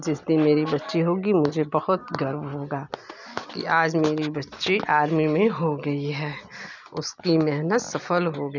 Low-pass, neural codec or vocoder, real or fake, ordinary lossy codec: 7.2 kHz; none; real; none